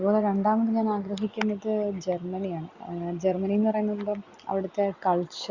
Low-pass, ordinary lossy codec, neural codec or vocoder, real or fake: 7.2 kHz; Opus, 64 kbps; none; real